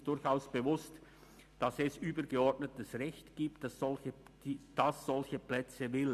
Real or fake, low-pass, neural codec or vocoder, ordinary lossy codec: real; 14.4 kHz; none; MP3, 96 kbps